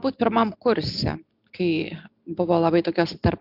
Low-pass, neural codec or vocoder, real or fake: 5.4 kHz; none; real